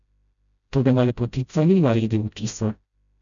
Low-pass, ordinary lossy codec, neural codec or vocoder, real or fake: 7.2 kHz; MP3, 96 kbps; codec, 16 kHz, 0.5 kbps, FreqCodec, smaller model; fake